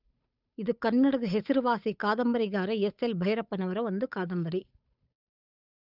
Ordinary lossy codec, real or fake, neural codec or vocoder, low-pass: none; fake; codec, 16 kHz, 8 kbps, FunCodec, trained on Chinese and English, 25 frames a second; 5.4 kHz